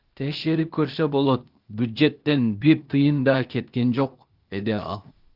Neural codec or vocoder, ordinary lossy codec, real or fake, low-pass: codec, 16 kHz, 0.8 kbps, ZipCodec; Opus, 32 kbps; fake; 5.4 kHz